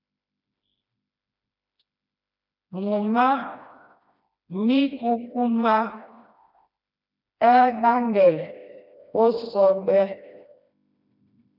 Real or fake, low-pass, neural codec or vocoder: fake; 5.4 kHz; codec, 16 kHz, 1 kbps, FreqCodec, smaller model